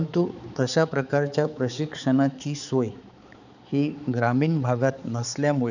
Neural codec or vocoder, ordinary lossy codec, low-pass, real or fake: codec, 16 kHz, 4 kbps, X-Codec, HuBERT features, trained on balanced general audio; none; 7.2 kHz; fake